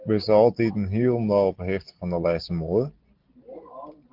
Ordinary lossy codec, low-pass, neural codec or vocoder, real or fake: Opus, 16 kbps; 5.4 kHz; none; real